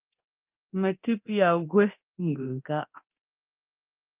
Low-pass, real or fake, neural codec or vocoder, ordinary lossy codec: 3.6 kHz; fake; codec, 24 kHz, 0.9 kbps, DualCodec; Opus, 24 kbps